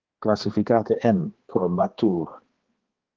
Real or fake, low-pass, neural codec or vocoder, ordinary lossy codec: fake; 7.2 kHz; codec, 16 kHz, 2 kbps, X-Codec, HuBERT features, trained on balanced general audio; Opus, 16 kbps